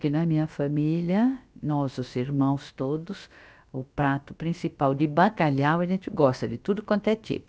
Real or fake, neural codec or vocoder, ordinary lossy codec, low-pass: fake; codec, 16 kHz, about 1 kbps, DyCAST, with the encoder's durations; none; none